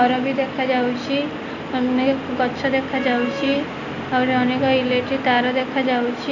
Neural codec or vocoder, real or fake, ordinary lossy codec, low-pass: none; real; none; 7.2 kHz